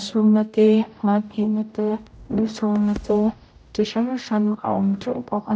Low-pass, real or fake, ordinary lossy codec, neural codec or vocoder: none; fake; none; codec, 16 kHz, 0.5 kbps, X-Codec, HuBERT features, trained on general audio